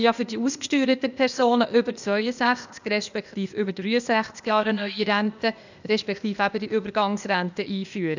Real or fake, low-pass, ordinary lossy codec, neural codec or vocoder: fake; 7.2 kHz; none; codec, 16 kHz, 0.8 kbps, ZipCodec